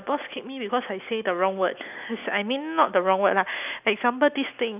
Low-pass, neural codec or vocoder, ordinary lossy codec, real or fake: 3.6 kHz; none; none; real